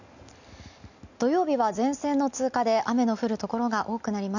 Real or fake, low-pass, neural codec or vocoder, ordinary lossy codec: real; 7.2 kHz; none; none